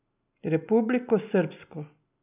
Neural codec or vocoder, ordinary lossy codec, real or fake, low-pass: none; none; real; 3.6 kHz